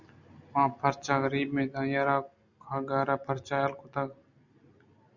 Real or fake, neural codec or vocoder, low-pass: real; none; 7.2 kHz